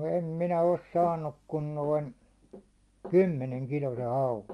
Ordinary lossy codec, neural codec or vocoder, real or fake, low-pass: Opus, 32 kbps; none; real; 10.8 kHz